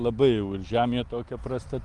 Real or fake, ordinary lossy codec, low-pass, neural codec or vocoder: real; Opus, 24 kbps; 10.8 kHz; none